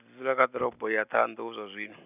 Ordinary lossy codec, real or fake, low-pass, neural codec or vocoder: none; real; 3.6 kHz; none